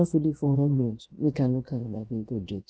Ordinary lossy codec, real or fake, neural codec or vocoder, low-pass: none; fake; codec, 16 kHz, 0.7 kbps, FocalCodec; none